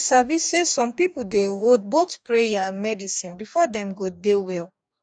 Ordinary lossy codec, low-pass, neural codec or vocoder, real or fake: none; 9.9 kHz; codec, 44.1 kHz, 2.6 kbps, DAC; fake